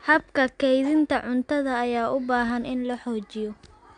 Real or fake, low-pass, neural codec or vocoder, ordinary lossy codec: real; 9.9 kHz; none; none